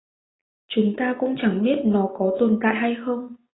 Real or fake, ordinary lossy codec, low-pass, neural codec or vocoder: real; AAC, 16 kbps; 7.2 kHz; none